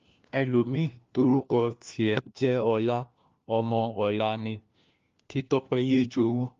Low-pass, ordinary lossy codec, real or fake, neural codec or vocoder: 7.2 kHz; Opus, 32 kbps; fake; codec, 16 kHz, 1 kbps, FunCodec, trained on LibriTTS, 50 frames a second